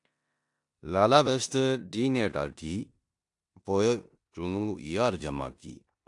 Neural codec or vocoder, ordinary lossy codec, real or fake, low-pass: codec, 16 kHz in and 24 kHz out, 0.9 kbps, LongCat-Audio-Codec, four codebook decoder; AAC, 64 kbps; fake; 10.8 kHz